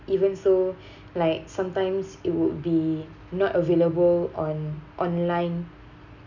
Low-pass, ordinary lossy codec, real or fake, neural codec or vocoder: 7.2 kHz; none; real; none